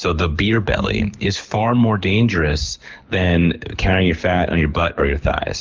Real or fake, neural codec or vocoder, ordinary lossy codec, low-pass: fake; codec, 24 kHz, 6 kbps, HILCodec; Opus, 32 kbps; 7.2 kHz